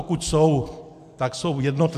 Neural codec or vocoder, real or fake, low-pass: none; real; 14.4 kHz